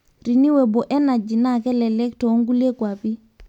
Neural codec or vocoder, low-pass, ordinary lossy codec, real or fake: none; 19.8 kHz; none; real